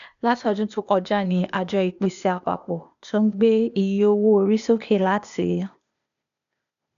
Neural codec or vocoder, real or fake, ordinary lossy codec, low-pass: codec, 16 kHz, 0.8 kbps, ZipCodec; fake; none; 7.2 kHz